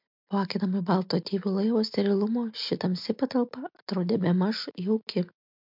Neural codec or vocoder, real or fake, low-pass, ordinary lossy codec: none; real; 5.4 kHz; MP3, 48 kbps